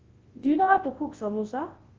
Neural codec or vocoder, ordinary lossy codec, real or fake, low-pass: codec, 24 kHz, 0.9 kbps, WavTokenizer, large speech release; Opus, 16 kbps; fake; 7.2 kHz